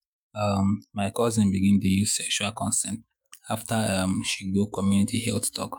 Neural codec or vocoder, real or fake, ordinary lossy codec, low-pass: autoencoder, 48 kHz, 128 numbers a frame, DAC-VAE, trained on Japanese speech; fake; none; none